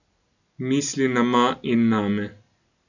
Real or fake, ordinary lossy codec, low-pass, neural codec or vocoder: real; none; 7.2 kHz; none